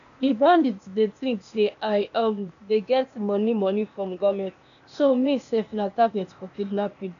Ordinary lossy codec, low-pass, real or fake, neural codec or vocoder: none; 7.2 kHz; fake; codec, 16 kHz, 0.8 kbps, ZipCodec